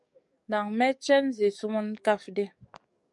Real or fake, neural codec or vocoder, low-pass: fake; codec, 44.1 kHz, 7.8 kbps, DAC; 10.8 kHz